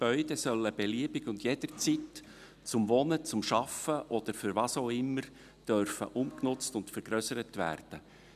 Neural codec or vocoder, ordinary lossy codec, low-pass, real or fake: none; none; 14.4 kHz; real